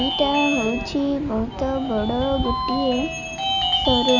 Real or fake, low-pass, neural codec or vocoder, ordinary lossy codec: real; 7.2 kHz; none; Opus, 64 kbps